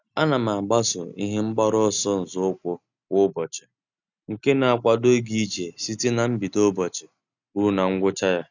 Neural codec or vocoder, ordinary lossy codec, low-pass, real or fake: none; none; 7.2 kHz; real